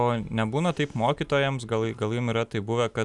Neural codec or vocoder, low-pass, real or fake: none; 10.8 kHz; real